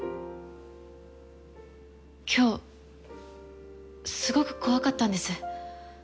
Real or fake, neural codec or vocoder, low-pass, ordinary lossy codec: real; none; none; none